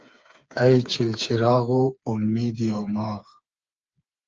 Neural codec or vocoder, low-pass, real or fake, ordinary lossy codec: codec, 16 kHz, 4 kbps, FreqCodec, smaller model; 7.2 kHz; fake; Opus, 24 kbps